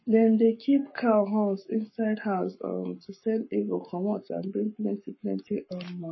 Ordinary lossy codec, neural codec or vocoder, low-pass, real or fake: MP3, 24 kbps; codec, 44.1 kHz, 7.8 kbps, Pupu-Codec; 7.2 kHz; fake